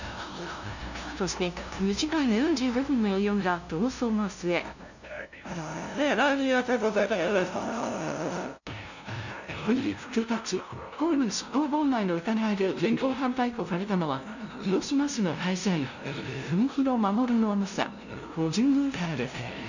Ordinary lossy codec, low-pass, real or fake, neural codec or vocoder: none; 7.2 kHz; fake; codec, 16 kHz, 0.5 kbps, FunCodec, trained on LibriTTS, 25 frames a second